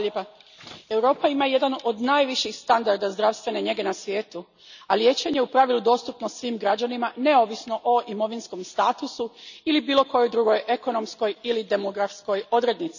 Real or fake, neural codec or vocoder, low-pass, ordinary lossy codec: real; none; 7.2 kHz; none